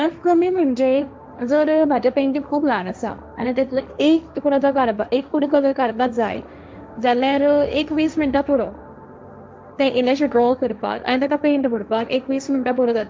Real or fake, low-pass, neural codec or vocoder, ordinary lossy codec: fake; none; codec, 16 kHz, 1.1 kbps, Voila-Tokenizer; none